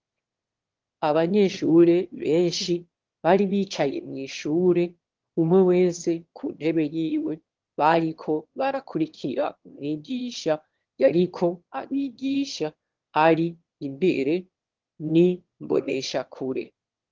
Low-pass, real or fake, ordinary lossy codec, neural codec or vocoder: 7.2 kHz; fake; Opus, 16 kbps; autoencoder, 22.05 kHz, a latent of 192 numbers a frame, VITS, trained on one speaker